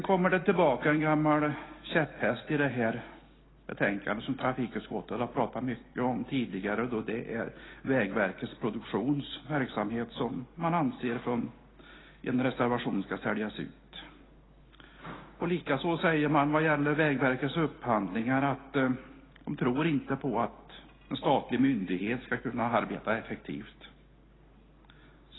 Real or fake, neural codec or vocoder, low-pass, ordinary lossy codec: real; none; 7.2 kHz; AAC, 16 kbps